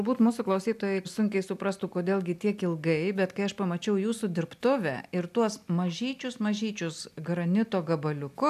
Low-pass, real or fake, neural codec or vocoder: 14.4 kHz; real; none